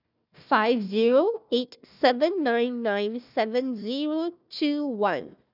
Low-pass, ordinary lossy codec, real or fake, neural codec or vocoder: 5.4 kHz; none; fake; codec, 16 kHz, 1 kbps, FunCodec, trained on Chinese and English, 50 frames a second